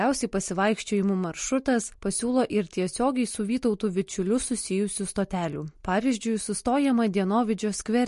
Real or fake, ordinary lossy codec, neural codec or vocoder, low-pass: real; MP3, 48 kbps; none; 14.4 kHz